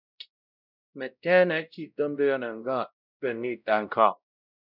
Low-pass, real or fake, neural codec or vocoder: 5.4 kHz; fake; codec, 16 kHz, 0.5 kbps, X-Codec, WavLM features, trained on Multilingual LibriSpeech